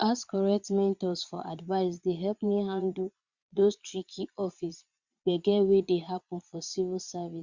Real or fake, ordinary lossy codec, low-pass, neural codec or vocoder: fake; Opus, 64 kbps; 7.2 kHz; vocoder, 22.05 kHz, 80 mel bands, Vocos